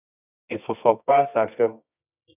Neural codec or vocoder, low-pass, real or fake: codec, 24 kHz, 0.9 kbps, WavTokenizer, medium music audio release; 3.6 kHz; fake